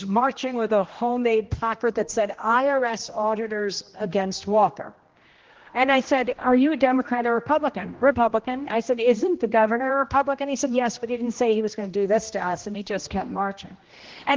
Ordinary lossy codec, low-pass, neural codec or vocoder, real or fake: Opus, 16 kbps; 7.2 kHz; codec, 16 kHz, 1 kbps, X-Codec, HuBERT features, trained on general audio; fake